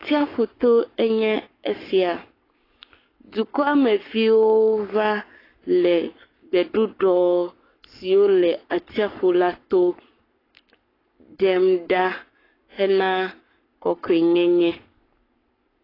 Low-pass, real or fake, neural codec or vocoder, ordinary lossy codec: 5.4 kHz; fake; codec, 44.1 kHz, 7.8 kbps, Pupu-Codec; AAC, 24 kbps